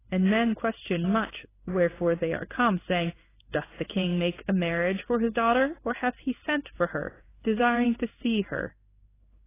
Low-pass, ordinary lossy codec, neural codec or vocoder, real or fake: 3.6 kHz; AAC, 16 kbps; codec, 16 kHz in and 24 kHz out, 1 kbps, XY-Tokenizer; fake